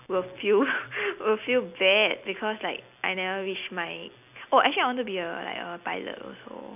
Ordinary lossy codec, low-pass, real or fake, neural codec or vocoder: none; 3.6 kHz; real; none